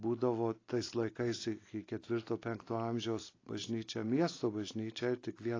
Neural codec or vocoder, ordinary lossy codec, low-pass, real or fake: none; AAC, 32 kbps; 7.2 kHz; real